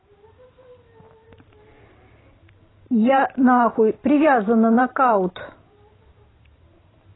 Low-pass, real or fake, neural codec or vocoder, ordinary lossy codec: 7.2 kHz; fake; vocoder, 44.1 kHz, 128 mel bands every 512 samples, BigVGAN v2; AAC, 16 kbps